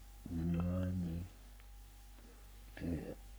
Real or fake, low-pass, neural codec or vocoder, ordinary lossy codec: fake; none; codec, 44.1 kHz, 3.4 kbps, Pupu-Codec; none